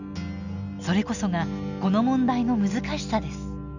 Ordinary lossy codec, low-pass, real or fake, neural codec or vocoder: none; 7.2 kHz; real; none